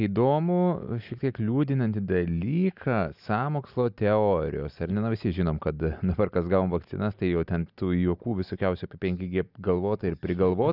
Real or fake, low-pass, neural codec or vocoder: real; 5.4 kHz; none